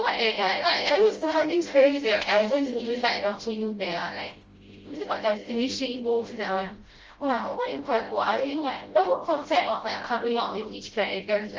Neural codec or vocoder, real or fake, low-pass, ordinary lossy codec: codec, 16 kHz, 0.5 kbps, FreqCodec, smaller model; fake; 7.2 kHz; Opus, 32 kbps